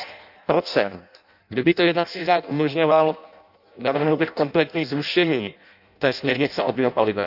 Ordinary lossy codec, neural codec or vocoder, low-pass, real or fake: none; codec, 16 kHz in and 24 kHz out, 0.6 kbps, FireRedTTS-2 codec; 5.4 kHz; fake